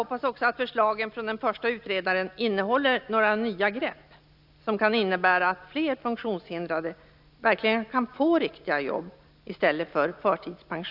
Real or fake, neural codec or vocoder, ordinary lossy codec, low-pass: real; none; none; 5.4 kHz